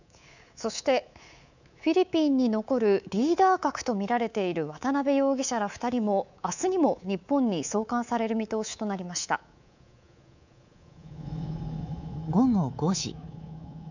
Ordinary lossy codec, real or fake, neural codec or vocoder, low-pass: none; fake; codec, 24 kHz, 3.1 kbps, DualCodec; 7.2 kHz